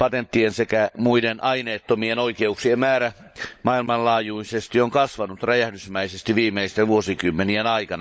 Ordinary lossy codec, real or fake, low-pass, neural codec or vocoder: none; fake; none; codec, 16 kHz, 16 kbps, FunCodec, trained on LibriTTS, 50 frames a second